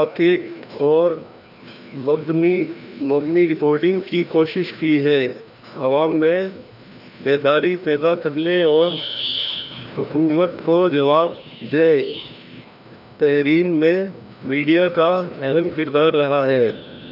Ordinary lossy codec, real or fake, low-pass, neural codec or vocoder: none; fake; 5.4 kHz; codec, 16 kHz, 1 kbps, FreqCodec, larger model